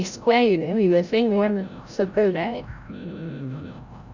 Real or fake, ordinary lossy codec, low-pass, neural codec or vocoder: fake; none; 7.2 kHz; codec, 16 kHz, 0.5 kbps, FreqCodec, larger model